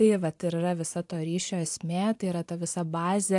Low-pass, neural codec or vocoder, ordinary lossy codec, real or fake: 10.8 kHz; vocoder, 44.1 kHz, 128 mel bands every 512 samples, BigVGAN v2; AAC, 64 kbps; fake